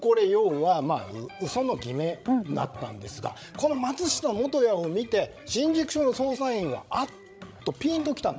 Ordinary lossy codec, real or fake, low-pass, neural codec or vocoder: none; fake; none; codec, 16 kHz, 16 kbps, FreqCodec, larger model